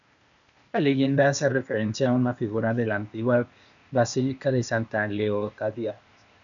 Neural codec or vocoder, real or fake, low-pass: codec, 16 kHz, 0.8 kbps, ZipCodec; fake; 7.2 kHz